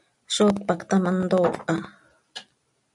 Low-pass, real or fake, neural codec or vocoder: 10.8 kHz; real; none